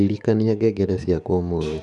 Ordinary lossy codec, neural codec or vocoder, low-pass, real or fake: none; codec, 44.1 kHz, 7.8 kbps, DAC; 10.8 kHz; fake